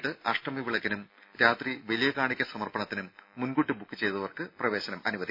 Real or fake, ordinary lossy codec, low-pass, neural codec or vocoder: real; none; 5.4 kHz; none